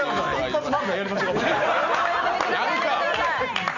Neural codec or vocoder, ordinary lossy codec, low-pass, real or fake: none; none; 7.2 kHz; real